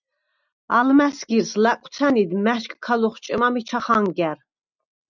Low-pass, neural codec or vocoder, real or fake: 7.2 kHz; none; real